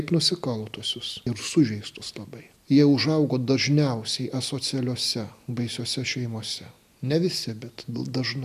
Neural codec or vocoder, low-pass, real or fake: none; 14.4 kHz; real